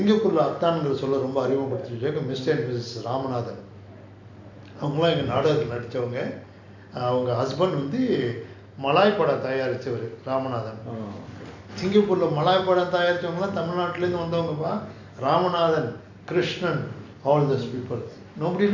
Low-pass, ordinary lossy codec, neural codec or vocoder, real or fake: 7.2 kHz; AAC, 48 kbps; none; real